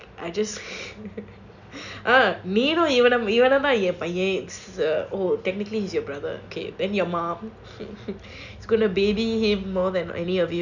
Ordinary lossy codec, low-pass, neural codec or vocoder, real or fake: none; 7.2 kHz; none; real